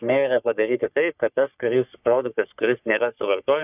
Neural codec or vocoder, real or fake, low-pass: codec, 44.1 kHz, 3.4 kbps, Pupu-Codec; fake; 3.6 kHz